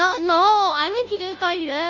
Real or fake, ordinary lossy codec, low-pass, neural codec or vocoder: fake; none; 7.2 kHz; codec, 16 kHz, 0.5 kbps, FunCodec, trained on Chinese and English, 25 frames a second